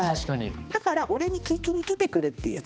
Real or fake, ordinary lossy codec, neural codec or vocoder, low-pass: fake; none; codec, 16 kHz, 2 kbps, X-Codec, HuBERT features, trained on balanced general audio; none